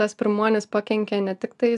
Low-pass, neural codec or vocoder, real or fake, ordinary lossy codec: 10.8 kHz; none; real; AAC, 96 kbps